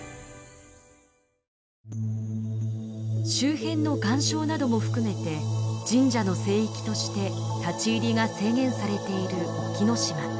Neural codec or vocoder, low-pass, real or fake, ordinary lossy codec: none; none; real; none